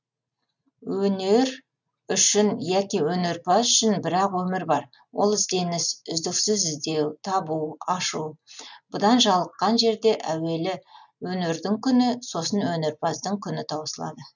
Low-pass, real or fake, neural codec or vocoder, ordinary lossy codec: 7.2 kHz; real; none; none